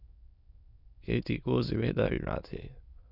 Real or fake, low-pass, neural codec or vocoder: fake; 5.4 kHz; autoencoder, 22.05 kHz, a latent of 192 numbers a frame, VITS, trained on many speakers